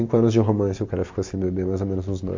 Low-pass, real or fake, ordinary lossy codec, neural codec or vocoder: 7.2 kHz; real; MP3, 48 kbps; none